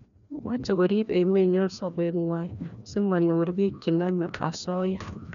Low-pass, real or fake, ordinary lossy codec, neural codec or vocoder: 7.2 kHz; fake; none; codec, 16 kHz, 1 kbps, FreqCodec, larger model